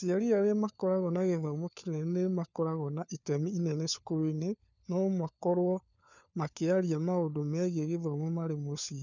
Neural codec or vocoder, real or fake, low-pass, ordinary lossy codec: codec, 16 kHz, 4 kbps, FunCodec, trained on LibriTTS, 50 frames a second; fake; 7.2 kHz; none